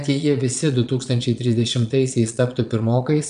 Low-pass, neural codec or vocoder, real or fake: 9.9 kHz; vocoder, 22.05 kHz, 80 mel bands, Vocos; fake